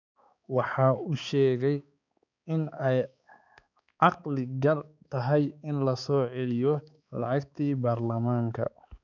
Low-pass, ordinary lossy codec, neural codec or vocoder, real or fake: 7.2 kHz; none; codec, 16 kHz, 4 kbps, X-Codec, HuBERT features, trained on balanced general audio; fake